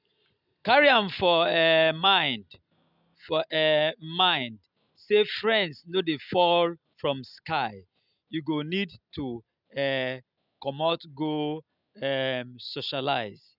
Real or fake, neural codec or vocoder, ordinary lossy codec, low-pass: real; none; none; 5.4 kHz